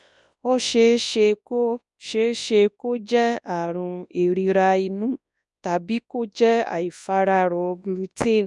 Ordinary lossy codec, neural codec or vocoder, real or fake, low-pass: none; codec, 24 kHz, 0.9 kbps, WavTokenizer, large speech release; fake; 10.8 kHz